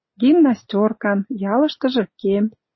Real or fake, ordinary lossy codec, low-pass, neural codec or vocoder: real; MP3, 24 kbps; 7.2 kHz; none